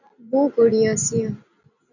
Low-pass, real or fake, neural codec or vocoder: 7.2 kHz; real; none